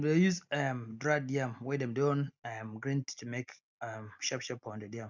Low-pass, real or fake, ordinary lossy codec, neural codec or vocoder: 7.2 kHz; real; none; none